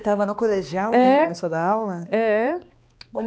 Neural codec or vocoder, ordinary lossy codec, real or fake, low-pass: codec, 16 kHz, 2 kbps, X-Codec, HuBERT features, trained on balanced general audio; none; fake; none